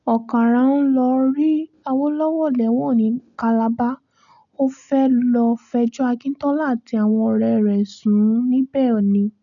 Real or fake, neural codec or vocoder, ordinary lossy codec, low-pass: real; none; none; 7.2 kHz